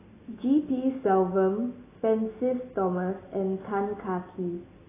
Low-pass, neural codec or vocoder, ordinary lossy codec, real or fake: 3.6 kHz; none; AAC, 16 kbps; real